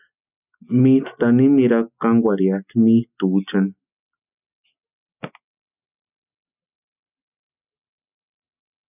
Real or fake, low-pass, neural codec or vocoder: real; 3.6 kHz; none